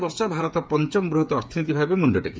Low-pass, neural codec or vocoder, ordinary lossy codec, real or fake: none; codec, 16 kHz, 8 kbps, FreqCodec, smaller model; none; fake